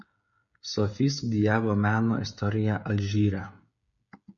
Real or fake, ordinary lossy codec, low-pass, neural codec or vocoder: fake; MP3, 64 kbps; 7.2 kHz; codec, 16 kHz, 16 kbps, FreqCodec, smaller model